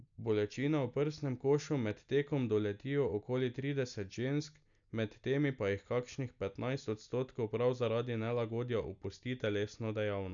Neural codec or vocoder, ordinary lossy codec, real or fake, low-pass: none; none; real; 7.2 kHz